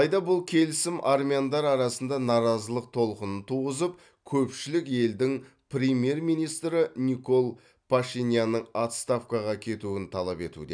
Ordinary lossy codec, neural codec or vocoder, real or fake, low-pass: none; none; real; 9.9 kHz